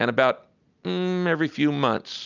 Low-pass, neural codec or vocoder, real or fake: 7.2 kHz; none; real